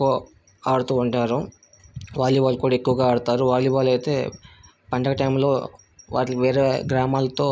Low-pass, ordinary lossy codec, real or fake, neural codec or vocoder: none; none; real; none